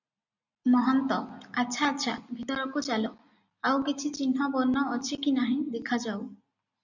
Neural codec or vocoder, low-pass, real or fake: none; 7.2 kHz; real